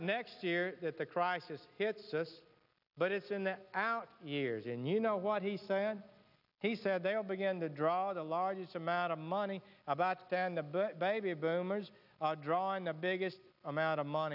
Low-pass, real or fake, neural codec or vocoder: 5.4 kHz; real; none